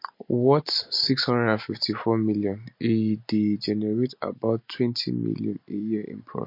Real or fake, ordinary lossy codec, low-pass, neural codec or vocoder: real; MP3, 32 kbps; 5.4 kHz; none